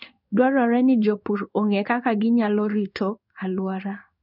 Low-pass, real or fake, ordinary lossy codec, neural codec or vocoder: 5.4 kHz; fake; none; codec, 16 kHz in and 24 kHz out, 1 kbps, XY-Tokenizer